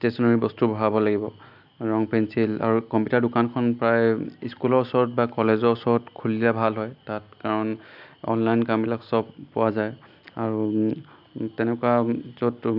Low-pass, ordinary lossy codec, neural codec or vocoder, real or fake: 5.4 kHz; AAC, 48 kbps; none; real